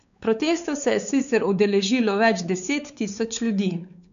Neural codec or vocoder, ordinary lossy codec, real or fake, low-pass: codec, 16 kHz, 4 kbps, X-Codec, WavLM features, trained on Multilingual LibriSpeech; none; fake; 7.2 kHz